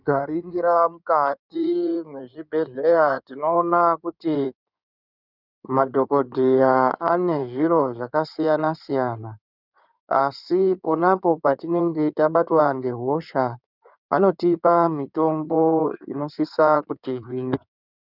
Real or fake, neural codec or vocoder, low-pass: fake; codec, 16 kHz in and 24 kHz out, 2.2 kbps, FireRedTTS-2 codec; 5.4 kHz